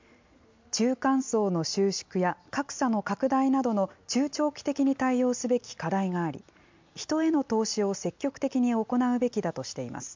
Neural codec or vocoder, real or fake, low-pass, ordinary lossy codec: none; real; 7.2 kHz; MP3, 64 kbps